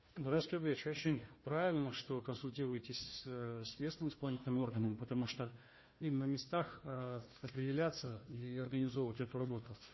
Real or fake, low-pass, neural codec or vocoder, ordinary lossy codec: fake; 7.2 kHz; codec, 16 kHz, 1 kbps, FunCodec, trained on Chinese and English, 50 frames a second; MP3, 24 kbps